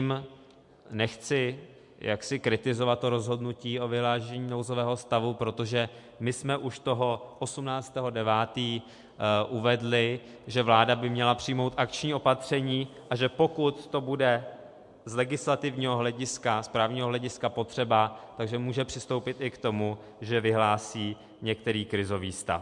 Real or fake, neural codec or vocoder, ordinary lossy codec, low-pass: real; none; MP3, 64 kbps; 10.8 kHz